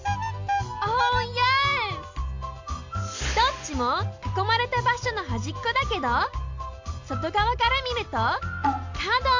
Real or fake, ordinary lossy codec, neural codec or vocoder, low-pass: real; AAC, 48 kbps; none; 7.2 kHz